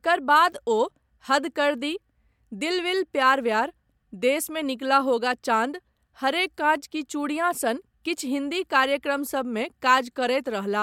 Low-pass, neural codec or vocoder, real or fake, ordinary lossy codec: 19.8 kHz; none; real; MP3, 96 kbps